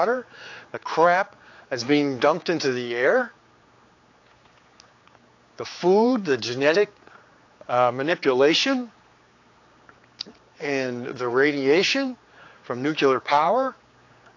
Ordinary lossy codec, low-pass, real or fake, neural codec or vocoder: AAC, 48 kbps; 7.2 kHz; fake; codec, 16 kHz, 4 kbps, X-Codec, HuBERT features, trained on general audio